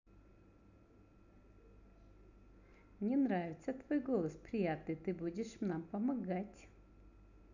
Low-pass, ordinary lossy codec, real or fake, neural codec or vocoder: 7.2 kHz; none; real; none